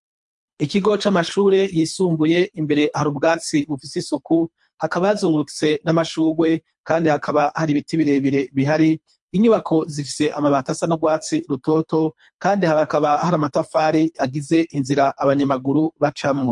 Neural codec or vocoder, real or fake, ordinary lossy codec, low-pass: codec, 24 kHz, 3 kbps, HILCodec; fake; MP3, 64 kbps; 10.8 kHz